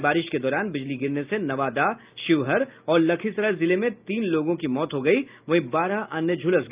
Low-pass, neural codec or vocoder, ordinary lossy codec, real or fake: 3.6 kHz; none; Opus, 24 kbps; real